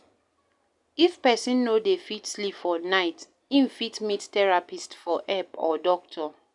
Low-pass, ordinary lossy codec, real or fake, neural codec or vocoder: 10.8 kHz; none; real; none